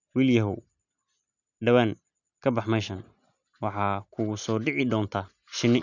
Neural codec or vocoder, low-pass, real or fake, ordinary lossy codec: none; 7.2 kHz; real; none